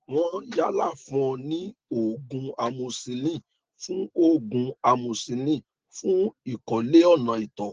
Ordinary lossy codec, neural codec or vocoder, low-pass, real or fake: Opus, 16 kbps; none; 7.2 kHz; real